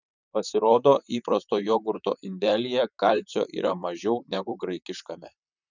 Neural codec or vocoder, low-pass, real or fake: vocoder, 44.1 kHz, 128 mel bands, Pupu-Vocoder; 7.2 kHz; fake